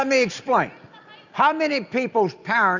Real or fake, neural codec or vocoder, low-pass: real; none; 7.2 kHz